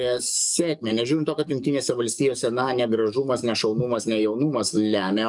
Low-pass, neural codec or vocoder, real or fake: 10.8 kHz; codec, 44.1 kHz, 7.8 kbps, DAC; fake